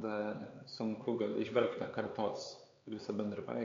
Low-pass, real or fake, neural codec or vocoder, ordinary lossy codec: 7.2 kHz; fake; codec, 16 kHz, 4 kbps, X-Codec, HuBERT features, trained on LibriSpeech; MP3, 48 kbps